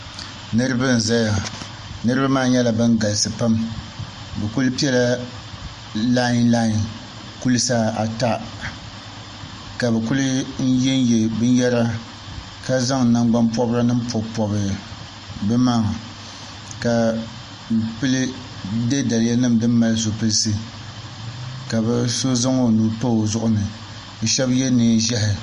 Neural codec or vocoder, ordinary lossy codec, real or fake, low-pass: none; MP3, 48 kbps; real; 14.4 kHz